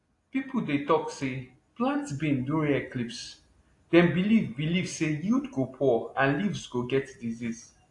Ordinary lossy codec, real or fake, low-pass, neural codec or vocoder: AAC, 48 kbps; real; 10.8 kHz; none